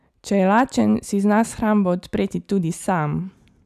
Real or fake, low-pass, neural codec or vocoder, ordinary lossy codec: real; 14.4 kHz; none; none